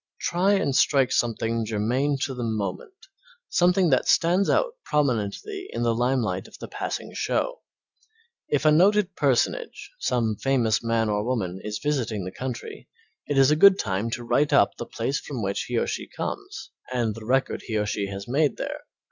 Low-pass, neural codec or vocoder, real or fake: 7.2 kHz; none; real